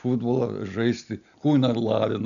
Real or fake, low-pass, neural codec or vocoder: real; 7.2 kHz; none